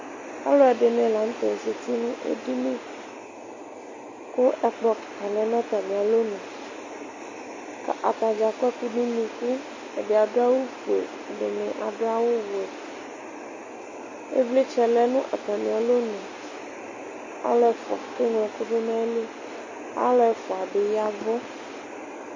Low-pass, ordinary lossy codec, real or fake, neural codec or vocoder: 7.2 kHz; MP3, 32 kbps; real; none